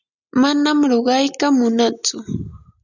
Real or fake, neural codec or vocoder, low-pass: real; none; 7.2 kHz